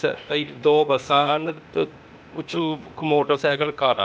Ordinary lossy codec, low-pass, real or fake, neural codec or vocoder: none; none; fake; codec, 16 kHz, 0.8 kbps, ZipCodec